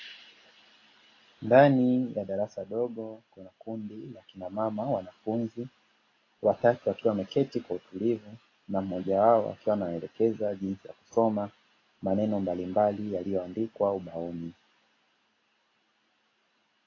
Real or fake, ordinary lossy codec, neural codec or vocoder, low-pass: real; AAC, 32 kbps; none; 7.2 kHz